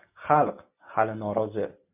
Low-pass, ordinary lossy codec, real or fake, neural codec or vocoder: 3.6 kHz; MP3, 32 kbps; real; none